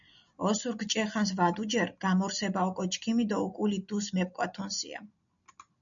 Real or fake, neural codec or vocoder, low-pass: real; none; 7.2 kHz